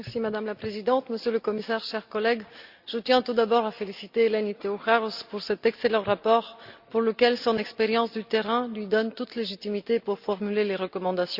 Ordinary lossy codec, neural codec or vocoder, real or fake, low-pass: Opus, 64 kbps; none; real; 5.4 kHz